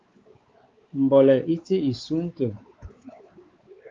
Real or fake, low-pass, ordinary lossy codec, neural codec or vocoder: fake; 7.2 kHz; Opus, 32 kbps; codec, 16 kHz, 4 kbps, X-Codec, WavLM features, trained on Multilingual LibriSpeech